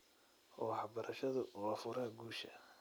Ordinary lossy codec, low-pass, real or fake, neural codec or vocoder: none; none; real; none